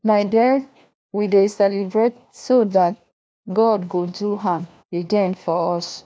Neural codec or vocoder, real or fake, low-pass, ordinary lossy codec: codec, 16 kHz, 1 kbps, FunCodec, trained on LibriTTS, 50 frames a second; fake; none; none